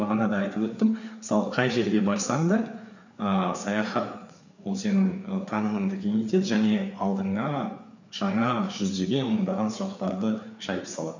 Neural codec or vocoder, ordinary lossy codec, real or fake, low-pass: codec, 16 kHz, 4 kbps, FreqCodec, larger model; none; fake; 7.2 kHz